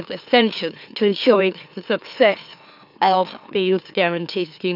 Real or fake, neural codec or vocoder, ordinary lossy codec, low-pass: fake; autoencoder, 44.1 kHz, a latent of 192 numbers a frame, MeloTTS; none; 5.4 kHz